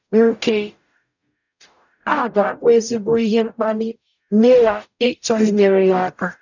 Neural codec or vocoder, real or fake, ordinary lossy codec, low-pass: codec, 44.1 kHz, 0.9 kbps, DAC; fake; none; 7.2 kHz